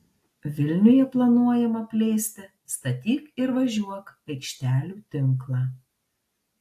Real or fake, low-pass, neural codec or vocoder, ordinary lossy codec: real; 14.4 kHz; none; AAC, 64 kbps